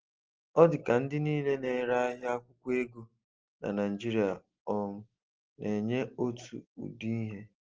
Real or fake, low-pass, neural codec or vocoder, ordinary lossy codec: real; 7.2 kHz; none; Opus, 16 kbps